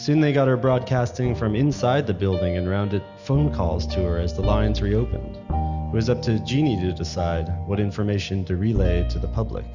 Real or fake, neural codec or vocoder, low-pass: real; none; 7.2 kHz